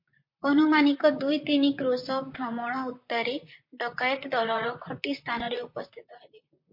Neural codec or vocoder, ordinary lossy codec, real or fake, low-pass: vocoder, 44.1 kHz, 128 mel bands, Pupu-Vocoder; MP3, 32 kbps; fake; 5.4 kHz